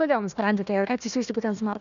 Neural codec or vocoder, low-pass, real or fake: codec, 16 kHz, 1 kbps, FunCodec, trained on Chinese and English, 50 frames a second; 7.2 kHz; fake